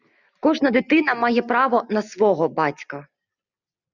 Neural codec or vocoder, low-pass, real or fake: none; 7.2 kHz; real